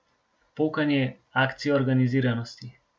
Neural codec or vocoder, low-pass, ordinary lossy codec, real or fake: none; none; none; real